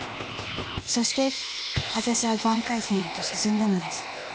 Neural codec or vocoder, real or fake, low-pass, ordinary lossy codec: codec, 16 kHz, 0.8 kbps, ZipCodec; fake; none; none